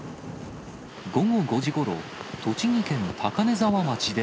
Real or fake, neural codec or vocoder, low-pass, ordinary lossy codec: real; none; none; none